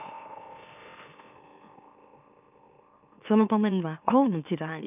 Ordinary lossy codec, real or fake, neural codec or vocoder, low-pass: none; fake; autoencoder, 44.1 kHz, a latent of 192 numbers a frame, MeloTTS; 3.6 kHz